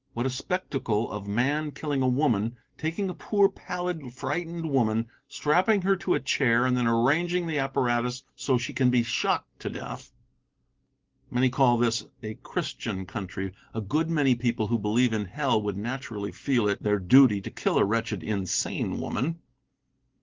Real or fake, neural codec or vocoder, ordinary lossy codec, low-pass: real; none; Opus, 16 kbps; 7.2 kHz